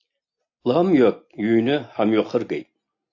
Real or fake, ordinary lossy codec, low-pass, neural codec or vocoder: real; AAC, 48 kbps; 7.2 kHz; none